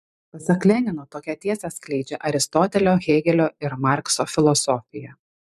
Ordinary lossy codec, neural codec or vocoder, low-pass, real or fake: MP3, 96 kbps; vocoder, 44.1 kHz, 128 mel bands every 512 samples, BigVGAN v2; 14.4 kHz; fake